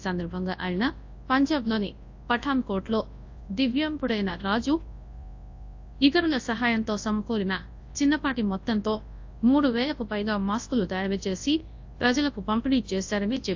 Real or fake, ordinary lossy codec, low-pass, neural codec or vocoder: fake; AAC, 48 kbps; 7.2 kHz; codec, 24 kHz, 0.9 kbps, WavTokenizer, large speech release